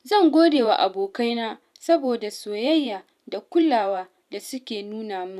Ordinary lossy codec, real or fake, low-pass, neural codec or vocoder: none; fake; 14.4 kHz; vocoder, 44.1 kHz, 128 mel bands every 512 samples, BigVGAN v2